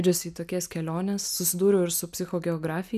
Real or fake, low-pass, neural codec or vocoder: real; 14.4 kHz; none